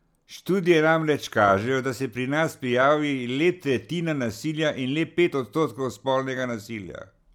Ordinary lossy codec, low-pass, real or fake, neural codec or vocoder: none; 19.8 kHz; real; none